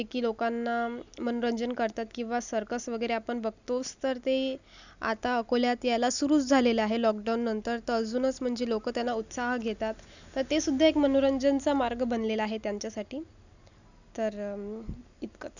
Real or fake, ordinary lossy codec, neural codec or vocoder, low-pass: real; none; none; 7.2 kHz